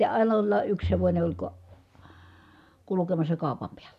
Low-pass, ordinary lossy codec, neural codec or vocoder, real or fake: 14.4 kHz; none; none; real